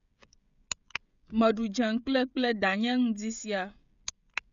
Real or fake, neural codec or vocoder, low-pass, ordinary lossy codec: fake; codec, 16 kHz, 16 kbps, FreqCodec, smaller model; 7.2 kHz; none